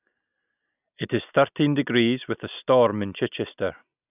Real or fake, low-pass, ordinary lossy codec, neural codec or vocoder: real; 3.6 kHz; none; none